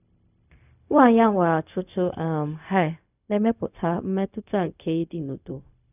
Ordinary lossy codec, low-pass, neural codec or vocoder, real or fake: none; 3.6 kHz; codec, 16 kHz, 0.4 kbps, LongCat-Audio-Codec; fake